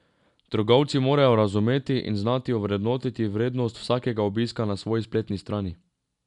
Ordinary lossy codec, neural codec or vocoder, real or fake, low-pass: none; none; real; 10.8 kHz